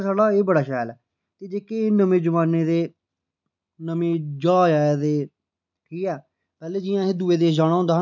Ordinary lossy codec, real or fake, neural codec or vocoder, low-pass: none; real; none; 7.2 kHz